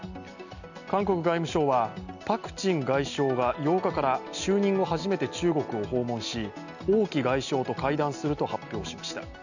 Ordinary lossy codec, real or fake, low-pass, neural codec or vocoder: none; real; 7.2 kHz; none